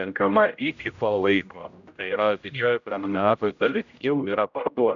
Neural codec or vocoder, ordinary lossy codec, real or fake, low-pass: codec, 16 kHz, 0.5 kbps, X-Codec, HuBERT features, trained on general audio; MP3, 96 kbps; fake; 7.2 kHz